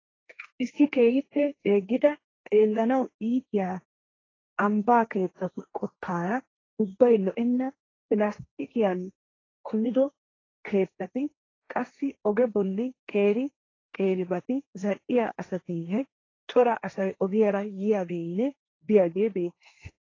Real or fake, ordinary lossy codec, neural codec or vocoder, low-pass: fake; AAC, 32 kbps; codec, 16 kHz, 1.1 kbps, Voila-Tokenizer; 7.2 kHz